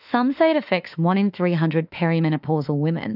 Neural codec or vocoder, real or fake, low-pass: autoencoder, 48 kHz, 32 numbers a frame, DAC-VAE, trained on Japanese speech; fake; 5.4 kHz